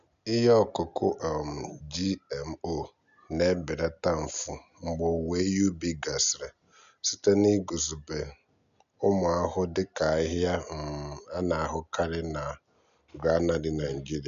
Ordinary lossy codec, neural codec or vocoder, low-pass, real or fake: none; none; 7.2 kHz; real